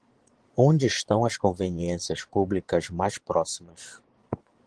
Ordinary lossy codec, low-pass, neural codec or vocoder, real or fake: Opus, 16 kbps; 9.9 kHz; vocoder, 22.05 kHz, 80 mel bands, Vocos; fake